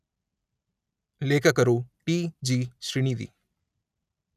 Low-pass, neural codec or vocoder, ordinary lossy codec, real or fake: 14.4 kHz; none; none; real